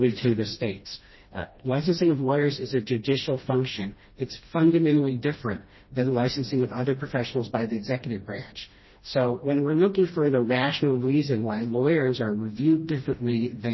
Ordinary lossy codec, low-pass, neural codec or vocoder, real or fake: MP3, 24 kbps; 7.2 kHz; codec, 16 kHz, 1 kbps, FreqCodec, smaller model; fake